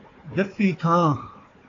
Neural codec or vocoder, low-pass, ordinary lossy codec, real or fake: codec, 16 kHz, 4 kbps, FunCodec, trained on Chinese and English, 50 frames a second; 7.2 kHz; AAC, 32 kbps; fake